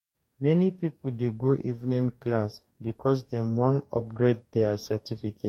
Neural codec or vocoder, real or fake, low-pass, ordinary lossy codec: codec, 44.1 kHz, 2.6 kbps, DAC; fake; 19.8 kHz; MP3, 64 kbps